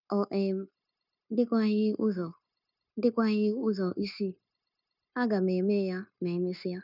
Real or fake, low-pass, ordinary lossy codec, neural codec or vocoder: fake; 5.4 kHz; none; codec, 16 kHz, 0.9 kbps, LongCat-Audio-Codec